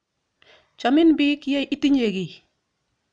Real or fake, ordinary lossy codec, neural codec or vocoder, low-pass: real; none; none; 9.9 kHz